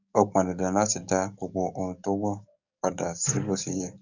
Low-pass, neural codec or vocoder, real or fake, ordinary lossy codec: 7.2 kHz; codec, 44.1 kHz, 7.8 kbps, DAC; fake; none